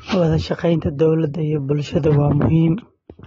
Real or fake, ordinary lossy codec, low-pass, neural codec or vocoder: real; AAC, 24 kbps; 19.8 kHz; none